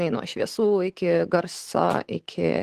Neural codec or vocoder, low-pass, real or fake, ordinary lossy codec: autoencoder, 48 kHz, 128 numbers a frame, DAC-VAE, trained on Japanese speech; 14.4 kHz; fake; Opus, 16 kbps